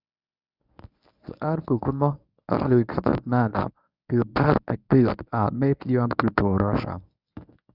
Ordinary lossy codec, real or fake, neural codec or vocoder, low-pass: none; fake; codec, 24 kHz, 0.9 kbps, WavTokenizer, medium speech release version 1; 5.4 kHz